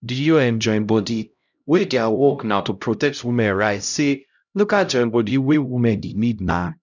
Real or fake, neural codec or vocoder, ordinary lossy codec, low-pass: fake; codec, 16 kHz, 0.5 kbps, X-Codec, HuBERT features, trained on LibriSpeech; none; 7.2 kHz